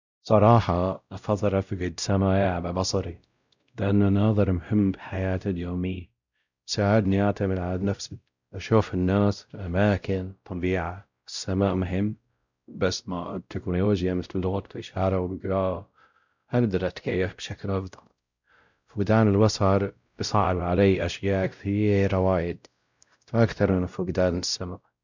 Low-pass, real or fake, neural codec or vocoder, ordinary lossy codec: 7.2 kHz; fake; codec, 16 kHz, 0.5 kbps, X-Codec, WavLM features, trained on Multilingual LibriSpeech; none